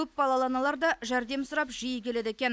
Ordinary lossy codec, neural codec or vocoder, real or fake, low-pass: none; none; real; none